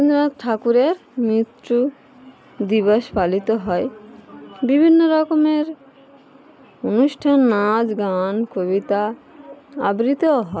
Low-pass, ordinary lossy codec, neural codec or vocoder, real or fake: none; none; none; real